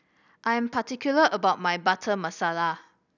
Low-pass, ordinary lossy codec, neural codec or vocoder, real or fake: 7.2 kHz; none; none; real